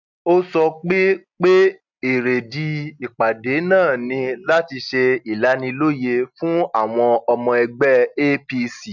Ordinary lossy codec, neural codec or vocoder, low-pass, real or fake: none; none; 7.2 kHz; real